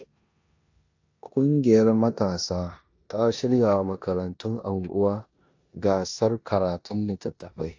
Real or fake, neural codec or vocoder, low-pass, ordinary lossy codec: fake; codec, 16 kHz in and 24 kHz out, 0.9 kbps, LongCat-Audio-Codec, four codebook decoder; 7.2 kHz; AAC, 48 kbps